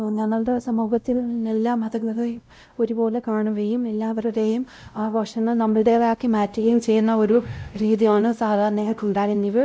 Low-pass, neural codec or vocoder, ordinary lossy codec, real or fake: none; codec, 16 kHz, 0.5 kbps, X-Codec, WavLM features, trained on Multilingual LibriSpeech; none; fake